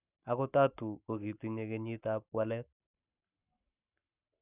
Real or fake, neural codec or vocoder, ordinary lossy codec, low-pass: fake; codec, 44.1 kHz, 7.8 kbps, Pupu-Codec; none; 3.6 kHz